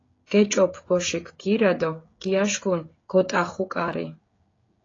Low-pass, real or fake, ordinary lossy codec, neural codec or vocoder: 7.2 kHz; fake; AAC, 32 kbps; codec, 16 kHz, 16 kbps, FreqCodec, smaller model